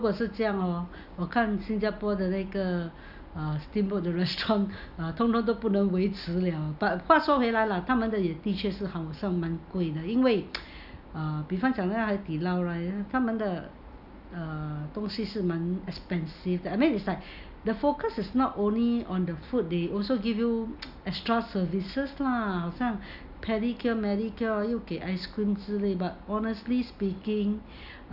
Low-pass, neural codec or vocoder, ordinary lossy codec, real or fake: 5.4 kHz; none; none; real